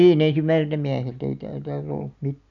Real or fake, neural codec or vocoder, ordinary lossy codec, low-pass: real; none; none; 7.2 kHz